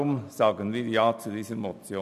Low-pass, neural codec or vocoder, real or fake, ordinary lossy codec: 14.4 kHz; none; real; none